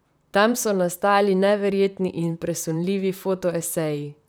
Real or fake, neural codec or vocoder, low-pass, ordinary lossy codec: fake; vocoder, 44.1 kHz, 128 mel bands, Pupu-Vocoder; none; none